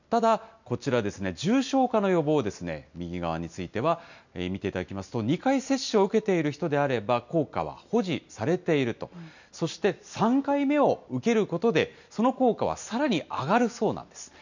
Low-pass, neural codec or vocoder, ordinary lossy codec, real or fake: 7.2 kHz; none; none; real